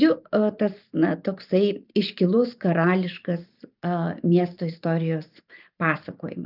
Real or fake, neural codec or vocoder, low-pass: fake; vocoder, 44.1 kHz, 128 mel bands every 512 samples, BigVGAN v2; 5.4 kHz